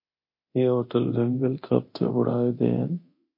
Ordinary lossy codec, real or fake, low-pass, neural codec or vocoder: MP3, 32 kbps; fake; 5.4 kHz; codec, 24 kHz, 0.9 kbps, DualCodec